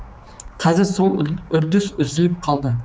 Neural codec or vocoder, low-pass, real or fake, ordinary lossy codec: codec, 16 kHz, 4 kbps, X-Codec, HuBERT features, trained on general audio; none; fake; none